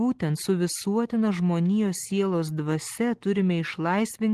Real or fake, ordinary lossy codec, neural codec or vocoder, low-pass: real; Opus, 16 kbps; none; 14.4 kHz